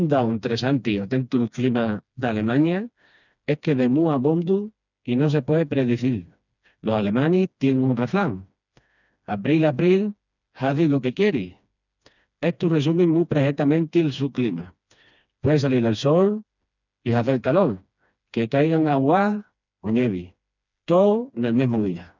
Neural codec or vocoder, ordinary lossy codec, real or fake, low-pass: codec, 16 kHz, 2 kbps, FreqCodec, smaller model; none; fake; 7.2 kHz